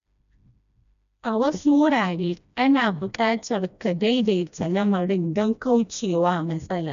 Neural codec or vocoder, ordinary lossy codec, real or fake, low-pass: codec, 16 kHz, 1 kbps, FreqCodec, smaller model; MP3, 96 kbps; fake; 7.2 kHz